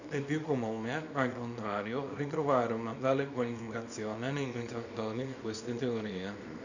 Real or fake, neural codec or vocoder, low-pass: fake; codec, 24 kHz, 0.9 kbps, WavTokenizer, small release; 7.2 kHz